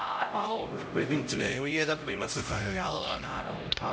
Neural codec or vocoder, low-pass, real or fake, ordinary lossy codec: codec, 16 kHz, 0.5 kbps, X-Codec, HuBERT features, trained on LibriSpeech; none; fake; none